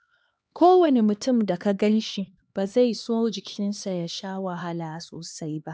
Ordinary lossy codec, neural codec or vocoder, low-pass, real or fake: none; codec, 16 kHz, 2 kbps, X-Codec, HuBERT features, trained on LibriSpeech; none; fake